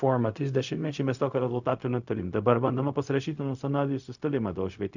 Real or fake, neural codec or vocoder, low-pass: fake; codec, 16 kHz, 0.4 kbps, LongCat-Audio-Codec; 7.2 kHz